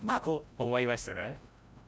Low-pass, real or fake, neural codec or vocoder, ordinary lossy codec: none; fake; codec, 16 kHz, 0.5 kbps, FreqCodec, larger model; none